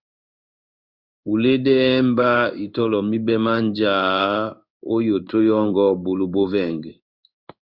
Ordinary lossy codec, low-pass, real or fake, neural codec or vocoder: Opus, 64 kbps; 5.4 kHz; fake; codec, 16 kHz in and 24 kHz out, 1 kbps, XY-Tokenizer